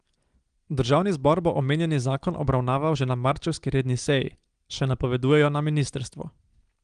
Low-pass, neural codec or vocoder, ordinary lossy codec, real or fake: 9.9 kHz; none; Opus, 24 kbps; real